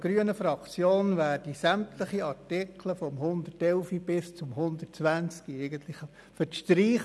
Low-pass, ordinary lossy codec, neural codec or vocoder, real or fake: none; none; none; real